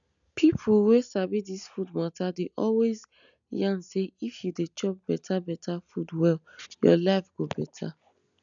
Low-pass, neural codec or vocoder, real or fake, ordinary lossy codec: 7.2 kHz; none; real; none